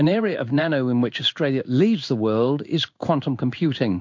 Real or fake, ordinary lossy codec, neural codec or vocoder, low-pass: fake; MP3, 48 kbps; codec, 16 kHz in and 24 kHz out, 1 kbps, XY-Tokenizer; 7.2 kHz